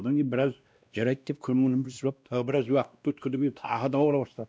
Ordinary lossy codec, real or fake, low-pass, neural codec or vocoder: none; fake; none; codec, 16 kHz, 1 kbps, X-Codec, WavLM features, trained on Multilingual LibriSpeech